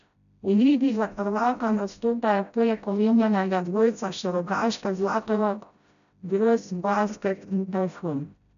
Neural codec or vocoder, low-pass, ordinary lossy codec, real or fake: codec, 16 kHz, 0.5 kbps, FreqCodec, smaller model; 7.2 kHz; none; fake